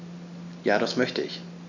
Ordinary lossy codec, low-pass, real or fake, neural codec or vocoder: none; 7.2 kHz; real; none